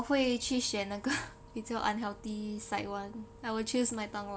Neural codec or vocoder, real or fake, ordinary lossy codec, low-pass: none; real; none; none